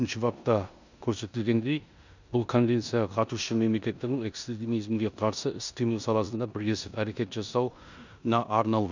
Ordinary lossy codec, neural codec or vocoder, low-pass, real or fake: none; codec, 16 kHz in and 24 kHz out, 0.9 kbps, LongCat-Audio-Codec, four codebook decoder; 7.2 kHz; fake